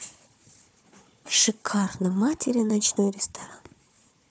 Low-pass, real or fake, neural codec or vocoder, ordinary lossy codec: none; fake; codec, 16 kHz, 4 kbps, FunCodec, trained on Chinese and English, 50 frames a second; none